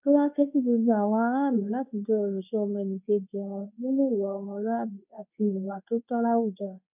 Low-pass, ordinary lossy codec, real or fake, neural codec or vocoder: 3.6 kHz; none; fake; codec, 24 kHz, 0.9 kbps, WavTokenizer, medium speech release version 2